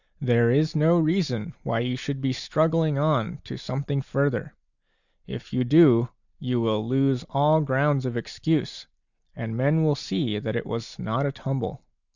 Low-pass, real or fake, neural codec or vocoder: 7.2 kHz; real; none